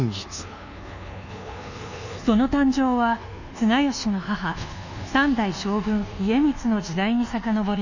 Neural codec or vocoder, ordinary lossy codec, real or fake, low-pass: codec, 24 kHz, 1.2 kbps, DualCodec; none; fake; 7.2 kHz